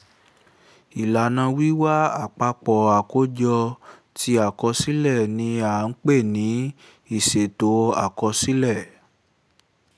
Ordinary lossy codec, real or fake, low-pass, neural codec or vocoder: none; real; none; none